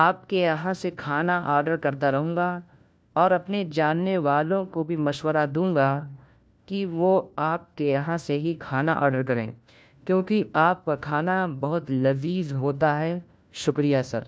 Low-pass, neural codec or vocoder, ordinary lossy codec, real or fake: none; codec, 16 kHz, 1 kbps, FunCodec, trained on LibriTTS, 50 frames a second; none; fake